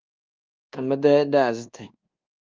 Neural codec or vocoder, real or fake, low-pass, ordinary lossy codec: codec, 24 kHz, 1.2 kbps, DualCodec; fake; 7.2 kHz; Opus, 32 kbps